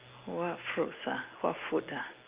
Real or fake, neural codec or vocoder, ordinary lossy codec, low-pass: real; none; Opus, 32 kbps; 3.6 kHz